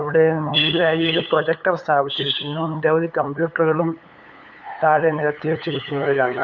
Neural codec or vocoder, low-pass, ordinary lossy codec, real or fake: codec, 16 kHz, 8 kbps, FunCodec, trained on LibriTTS, 25 frames a second; 7.2 kHz; none; fake